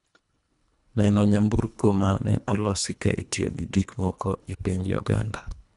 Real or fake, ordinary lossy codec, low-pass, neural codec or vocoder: fake; none; 10.8 kHz; codec, 24 kHz, 1.5 kbps, HILCodec